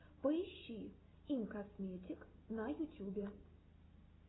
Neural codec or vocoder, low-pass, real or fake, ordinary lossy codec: none; 7.2 kHz; real; AAC, 16 kbps